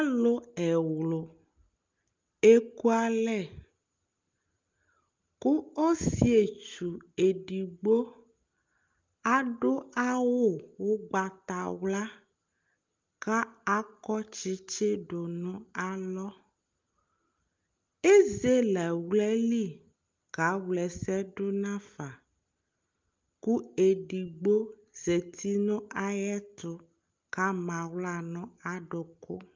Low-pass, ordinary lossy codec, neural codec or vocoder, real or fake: 7.2 kHz; Opus, 24 kbps; none; real